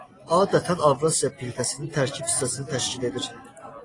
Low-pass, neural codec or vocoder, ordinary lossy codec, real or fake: 10.8 kHz; none; AAC, 32 kbps; real